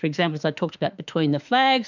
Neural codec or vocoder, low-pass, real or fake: autoencoder, 48 kHz, 32 numbers a frame, DAC-VAE, trained on Japanese speech; 7.2 kHz; fake